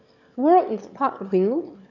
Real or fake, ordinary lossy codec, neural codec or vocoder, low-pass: fake; none; autoencoder, 22.05 kHz, a latent of 192 numbers a frame, VITS, trained on one speaker; 7.2 kHz